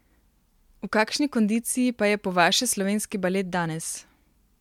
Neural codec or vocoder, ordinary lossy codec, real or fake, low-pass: none; MP3, 96 kbps; real; 19.8 kHz